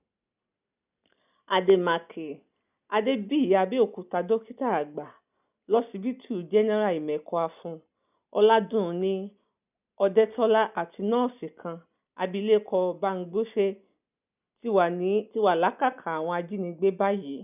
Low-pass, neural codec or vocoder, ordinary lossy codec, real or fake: 3.6 kHz; none; none; real